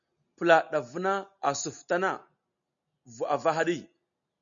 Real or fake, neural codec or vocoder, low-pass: real; none; 7.2 kHz